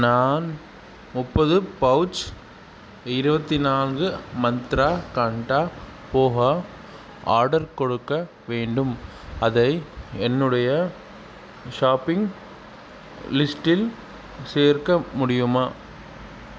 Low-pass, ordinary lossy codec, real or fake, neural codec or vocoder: none; none; real; none